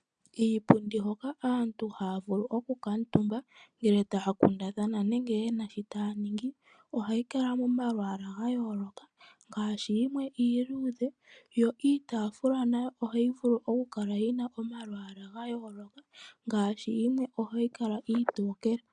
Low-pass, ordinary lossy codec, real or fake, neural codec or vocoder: 9.9 kHz; MP3, 96 kbps; real; none